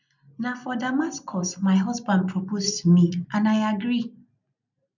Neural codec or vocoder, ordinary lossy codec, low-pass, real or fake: none; none; 7.2 kHz; real